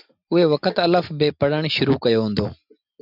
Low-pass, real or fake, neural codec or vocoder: 5.4 kHz; real; none